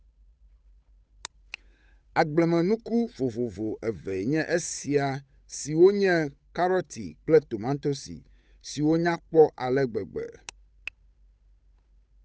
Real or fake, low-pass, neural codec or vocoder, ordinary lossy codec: fake; none; codec, 16 kHz, 8 kbps, FunCodec, trained on Chinese and English, 25 frames a second; none